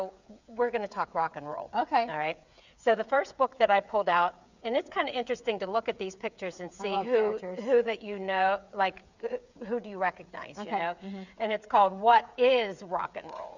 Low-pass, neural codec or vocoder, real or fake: 7.2 kHz; codec, 16 kHz, 8 kbps, FreqCodec, smaller model; fake